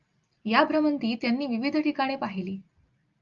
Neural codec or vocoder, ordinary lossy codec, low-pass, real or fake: none; Opus, 32 kbps; 7.2 kHz; real